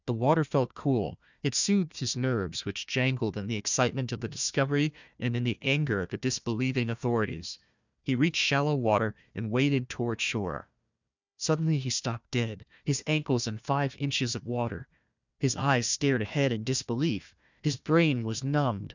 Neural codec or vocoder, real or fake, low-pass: codec, 16 kHz, 1 kbps, FunCodec, trained on Chinese and English, 50 frames a second; fake; 7.2 kHz